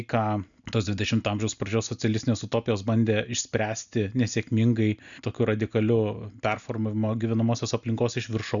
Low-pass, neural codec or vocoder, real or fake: 7.2 kHz; none; real